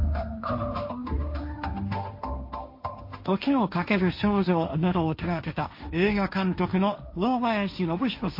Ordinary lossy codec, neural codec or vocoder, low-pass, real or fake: MP3, 32 kbps; codec, 16 kHz, 1.1 kbps, Voila-Tokenizer; 5.4 kHz; fake